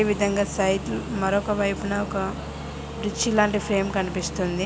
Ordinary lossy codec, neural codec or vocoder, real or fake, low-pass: none; none; real; none